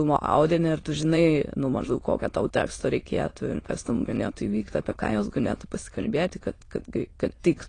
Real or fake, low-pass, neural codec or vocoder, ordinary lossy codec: fake; 9.9 kHz; autoencoder, 22.05 kHz, a latent of 192 numbers a frame, VITS, trained on many speakers; AAC, 32 kbps